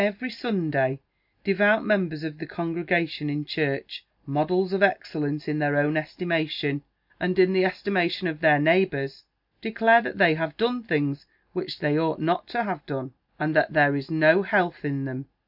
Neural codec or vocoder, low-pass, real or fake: none; 5.4 kHz; real